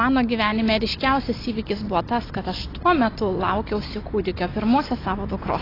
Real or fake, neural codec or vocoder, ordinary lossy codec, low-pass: real; none; AAC, 24 kbps; 5.4 kHz